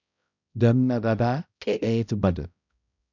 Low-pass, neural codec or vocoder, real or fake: 7.2 kHz; codec, 16 kHz, 0.5 kbps, X-Codec, HuBERT features, trained on balanced general audio; fake